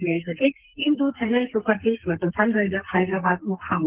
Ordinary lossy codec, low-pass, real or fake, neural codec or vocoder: Opus, 16 kbps; 3.6 kHz; fake; codec, 44.1 kHz, 2.6 kbps, SNAC